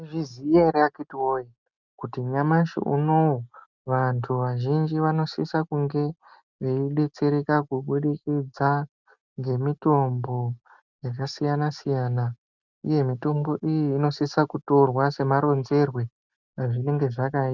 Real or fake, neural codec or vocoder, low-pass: real; none; 7.2 kHz